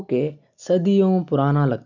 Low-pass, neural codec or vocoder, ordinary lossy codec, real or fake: 7.2 kHz; none; none; real